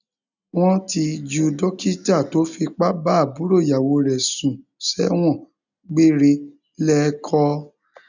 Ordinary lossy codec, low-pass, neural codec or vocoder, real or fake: none; 7.2 kHz; none; real